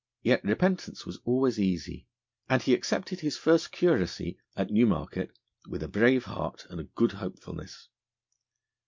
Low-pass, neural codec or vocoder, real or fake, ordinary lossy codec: 7.2 kHz; none; real; MP3, 64 kbps